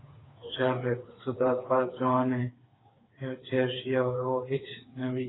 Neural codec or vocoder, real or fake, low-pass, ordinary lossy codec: codec, 16 kHz, 4 kbps, FreqCodec, smaller model; fake; 7.2 kHz; AAC, 16 kbps